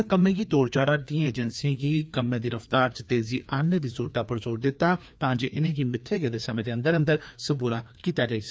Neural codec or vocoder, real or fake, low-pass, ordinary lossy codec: codec, 16 kHz, 2 kbps, FreqCodec, larger model; fake; none; none